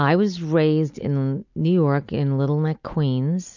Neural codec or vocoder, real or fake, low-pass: none; real; 7.2 kHz